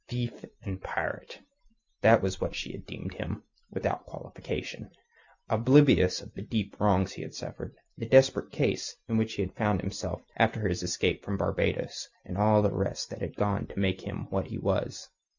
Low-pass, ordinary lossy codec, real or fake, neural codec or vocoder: 7.2 kHz; Opus, 64 kbps; real; none